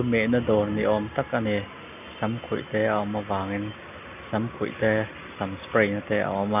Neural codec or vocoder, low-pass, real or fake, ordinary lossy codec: none; 3.6 kHz; real; none